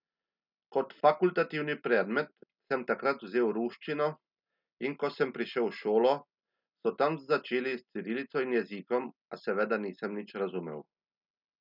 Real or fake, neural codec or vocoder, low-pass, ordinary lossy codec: real; none; 5.4 kHz; none